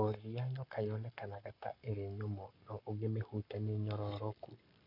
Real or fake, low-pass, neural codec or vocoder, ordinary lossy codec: fake; 5.4 kHz; codec, 44.1 kHz, 7.8 kbps, Pupu-Codec; none